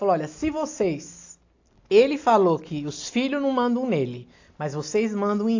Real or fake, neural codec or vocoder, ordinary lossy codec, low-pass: real; none; AAC, 48 kbps; 7.2 kHz